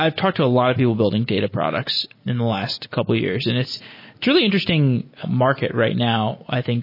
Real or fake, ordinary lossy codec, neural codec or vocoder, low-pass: real; MP3, 24 kbps; none; 5.4 kHz